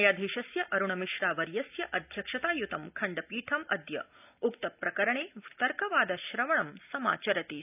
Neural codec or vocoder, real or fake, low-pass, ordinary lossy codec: none; real; 3.6 kHz; none